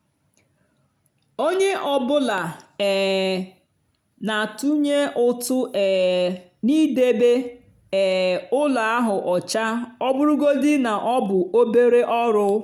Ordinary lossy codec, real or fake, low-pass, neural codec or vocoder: none; real; 19.8 kHz; none